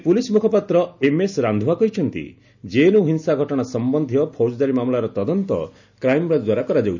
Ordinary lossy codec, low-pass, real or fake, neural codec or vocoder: none; 7.2 kHz; real; none